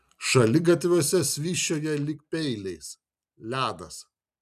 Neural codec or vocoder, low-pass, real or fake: vocoder, 44.1 kHz, 128 mel bands every 256 samples, BigVGAN v2; 14.4 kHz; fake